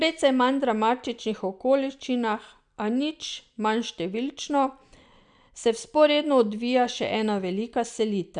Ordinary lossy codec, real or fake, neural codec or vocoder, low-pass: none; real; none; 9.9 kHz